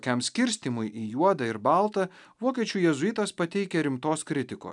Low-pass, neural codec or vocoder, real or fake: 10.8 kHz; none; real